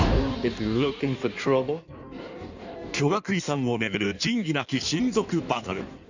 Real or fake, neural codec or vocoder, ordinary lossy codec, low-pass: fake; codec, 16 kHz in and 24 kHz out, 1.1 kbps, FireRedTTS-2 codec; none; 7.2 kHz